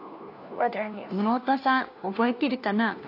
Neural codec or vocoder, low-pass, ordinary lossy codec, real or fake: codec, 16 kHz, 1 kbps, FunCodec, trained on LibriTTS, 50 frames a second; 5.4 kHz; AAC, 32 kbps; fake